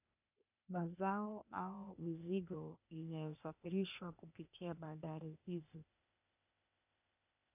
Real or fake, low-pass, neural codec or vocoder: fake; 3.6 kHz; codec, 16 kHz, 0.8 kbps, ZipCodec